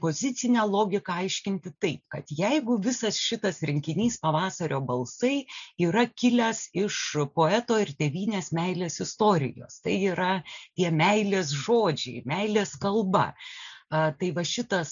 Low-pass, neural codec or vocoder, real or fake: 7.2 kHz; none; real